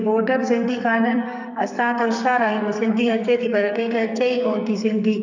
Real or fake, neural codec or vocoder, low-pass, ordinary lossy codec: fake; codec, 44.1 kHz, 2.6 kbps, SNAC; 7.2 kHz; none